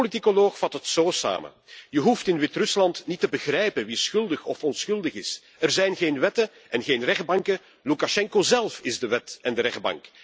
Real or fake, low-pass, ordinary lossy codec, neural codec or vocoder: real; none; none; none